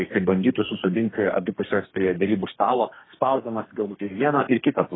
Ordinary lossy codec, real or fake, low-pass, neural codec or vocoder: AAC, 16 kbps; fake; 7.2 kHz; codec, 32 kHz, 1.9 kbps, SNAC